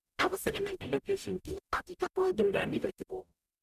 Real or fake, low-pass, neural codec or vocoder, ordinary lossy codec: fake; 14.4 kHz; codec, 44.1 kHz, 0.9 kbps, DAC; none